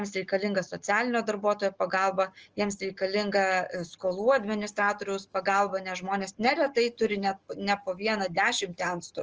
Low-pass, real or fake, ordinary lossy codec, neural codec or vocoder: 7.2 kHz; real; Opus, 24 kbps; none